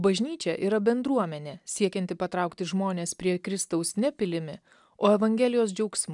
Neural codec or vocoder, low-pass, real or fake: none; 10.8 kHz; real